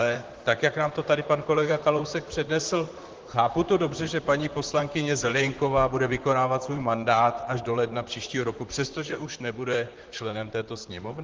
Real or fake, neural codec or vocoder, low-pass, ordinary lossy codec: fake; vocoder, 44.1 kHz, 128 mel bands, Pupu-Vocoder; 7.2 kHz; Opus, 16 kbps